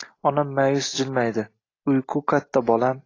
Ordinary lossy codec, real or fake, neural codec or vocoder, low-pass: AAC, 32 kbps; real; none; 7.2 kHz